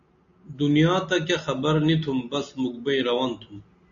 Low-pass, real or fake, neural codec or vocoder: 7.2 kHz; real; none